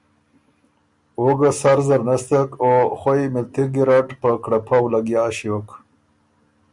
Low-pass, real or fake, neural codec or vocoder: 10.8 kHz; real; none